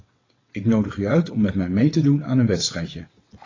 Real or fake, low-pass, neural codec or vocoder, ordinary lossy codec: fake; 7.2 kHz; vocoder, 22.05 kHz, 80 mel bands, WaveNeXt; AAC, 32 kbps